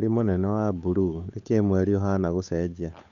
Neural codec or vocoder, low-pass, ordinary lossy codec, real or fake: codec, 16 kHz, 8 kbps, FunCodec, trained on Chinese and English, 25 frames a second; 7.2 kHz; Opus, 64 kbps; fake